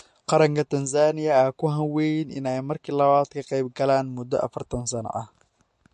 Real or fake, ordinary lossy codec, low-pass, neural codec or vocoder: real; MP3, 48 kbps; 14.4 kHz; none